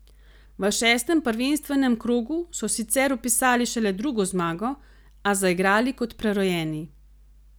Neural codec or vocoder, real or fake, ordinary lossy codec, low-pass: none; real; none; none